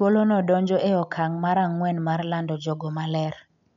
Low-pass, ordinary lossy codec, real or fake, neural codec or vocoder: 7.2 kHz; none; real; none